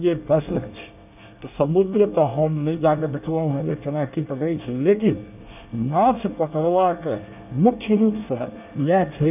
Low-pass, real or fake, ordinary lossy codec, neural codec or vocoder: 3.6 kHz; fake; none; codec, 24 kHz, 1 kbps, SNAC